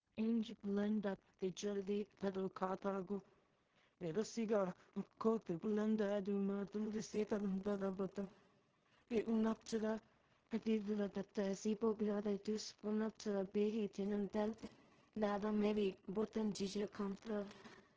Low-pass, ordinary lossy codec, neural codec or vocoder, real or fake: 7.2 kHz; Opus, 16 kbps; codec, 16 kHz in and 24 kHz out, 0.4 kbps, LongCat-Audio-Codec, two codebook decoder; fake